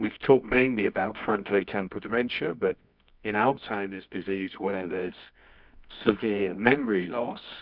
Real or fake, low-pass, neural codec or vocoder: fake; 5.4 kHz; codec, 24 kHz, 0.9 kbps, WavTokenizer, medium music audio release